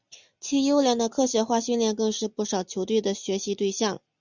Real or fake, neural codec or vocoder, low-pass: real; none; 7.2 kHz